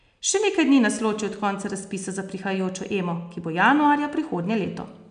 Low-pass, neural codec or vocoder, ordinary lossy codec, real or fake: 9.9 kHz; none; none; real